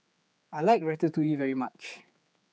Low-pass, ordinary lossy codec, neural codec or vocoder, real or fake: none; none; codec, 16 kHz, 4 kbps, X-Codec, HuBERT features, trained on general audio; fake